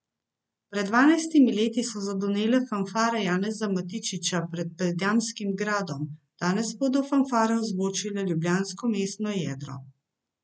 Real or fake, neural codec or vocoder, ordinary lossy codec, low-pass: real; none; none; none